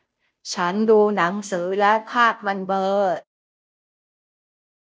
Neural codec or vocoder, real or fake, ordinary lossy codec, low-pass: codec, 16 kHz, 0.5 kbps, FunCodec, trained on Chinese and English, 25 frames a second; fake; none; none